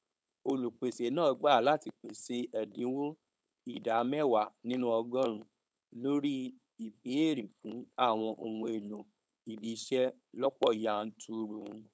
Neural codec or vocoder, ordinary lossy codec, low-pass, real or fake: codec, 16 kHz, 4.8 kbps, FACodec; none; none; fake